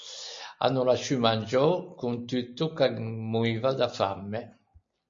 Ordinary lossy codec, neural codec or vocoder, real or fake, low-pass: MP3, 48 kbps; none; real; 7.2 kHz